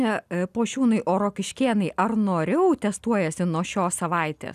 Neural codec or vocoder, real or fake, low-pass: none; real; 14.4 kHz